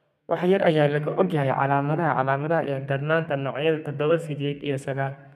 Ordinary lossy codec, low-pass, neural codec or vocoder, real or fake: none; 14.4 kHz; codec, 32 kHz, 1.9 kbps, SNAC; fake